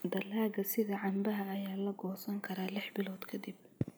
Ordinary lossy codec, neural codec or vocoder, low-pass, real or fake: none; none; none; real